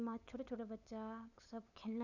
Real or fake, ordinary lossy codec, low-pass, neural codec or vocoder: fake; none; 7.2 kHz; codec, 16 kHz, 8 kbps, FunCodec, trained on LibriTTS, 25 frames a second